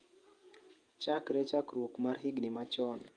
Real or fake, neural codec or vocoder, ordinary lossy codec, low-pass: fake; vocoder, 48 kHz, 128 mel bands, Vocos; Opus, 32 kbps; 9.9 kHz